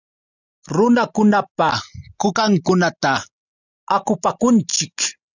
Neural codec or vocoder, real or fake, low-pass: none; real; 7.2 kHz